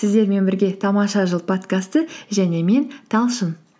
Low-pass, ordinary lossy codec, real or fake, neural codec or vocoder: none; none; real; none